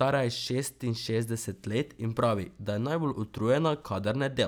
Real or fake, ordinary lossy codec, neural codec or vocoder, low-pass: real; none; none; none